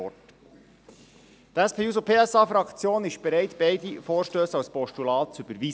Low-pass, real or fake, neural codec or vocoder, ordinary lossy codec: none; real; none; none